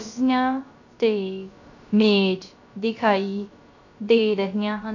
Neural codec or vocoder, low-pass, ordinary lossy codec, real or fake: codec, 16 kHz, 0.3 kbps, FocalCodec; 7.2 kHz; none; fake